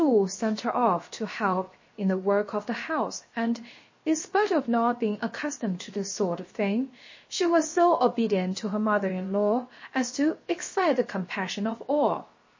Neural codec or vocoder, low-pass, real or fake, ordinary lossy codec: codec, 16 kHz, 0.7 kbps, FocalCodec; 7.2 kHz; fake; MP3, 32 kbps